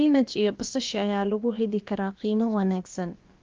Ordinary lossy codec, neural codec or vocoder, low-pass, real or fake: Opus, 32 kbps; codec, 16 kHz, about 1 kbps, DyCAST, with the encoder's durations; 7.2 kHz; fake